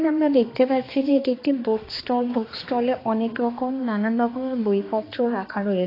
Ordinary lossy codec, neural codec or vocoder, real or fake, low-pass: AAC, 24 kbps; codec, 16 kHz, 2 kbps, X-Codec, HuBERT features, trained on balanced general audio; fake; 5.4 kHz